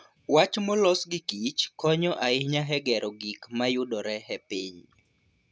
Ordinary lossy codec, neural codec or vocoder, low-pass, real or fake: none; none; none; real